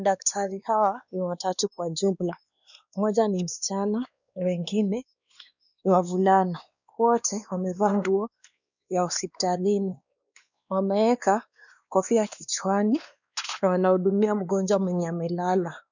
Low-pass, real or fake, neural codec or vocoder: 7.2 kHz; fake; codec, 16 kHz, 2 kbps, X-Codec, WavLM features, trained on Multilingual LibriSpeech